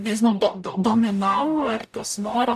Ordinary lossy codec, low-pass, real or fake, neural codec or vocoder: AAC, 96 kbps; 14.4 kHz; fake; codec, 44.1 kHz, 0.9 kbps, DAC